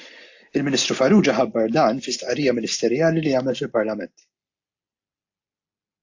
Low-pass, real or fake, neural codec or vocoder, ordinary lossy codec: 7.2 kHz; real; none; AAC, 48 kbps